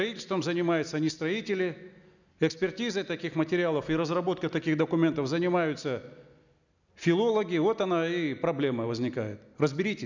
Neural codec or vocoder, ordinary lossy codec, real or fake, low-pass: none; none; real; 7.2 kHz